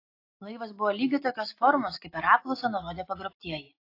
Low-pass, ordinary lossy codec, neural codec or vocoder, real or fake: 5.4 kHz; AAC, 32 kbps; none; real